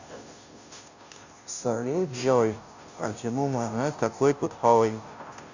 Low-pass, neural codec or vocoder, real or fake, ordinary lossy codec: 7.2 kHz; codec, 16 kHz, 0.5 kbps, FunCodec, trained on Chinese and English, 25 frames a second; fake; none